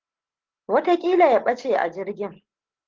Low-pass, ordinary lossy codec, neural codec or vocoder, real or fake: 7.2 kHz; Opus, 16 kbps; none; real